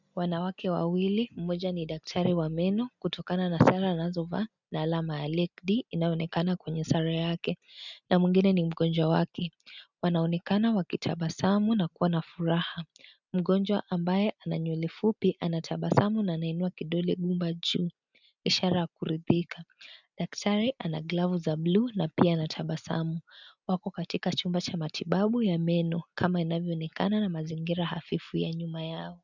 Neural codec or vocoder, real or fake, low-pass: none; real; 7.2 kHz